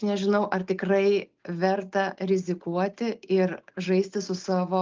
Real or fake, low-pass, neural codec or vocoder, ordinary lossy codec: real; 7.2 kHz; none; Opus, 24 kbps